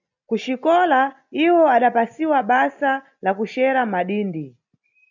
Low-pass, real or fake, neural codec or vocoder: 7.2 kHz; real; none